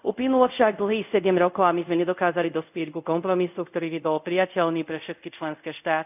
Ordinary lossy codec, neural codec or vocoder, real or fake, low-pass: none; codec, 24 kHz, 0.5 kbps, DualCodec; fake; 3.6 kHz